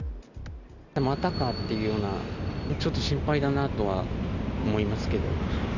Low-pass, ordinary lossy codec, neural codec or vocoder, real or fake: 7.2 kHz; none; none; real